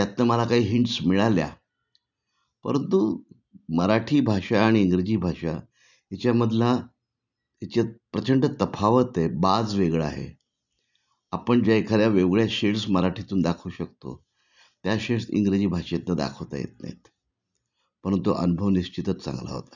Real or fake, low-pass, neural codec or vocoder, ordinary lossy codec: real; 7.2 kHz; none; none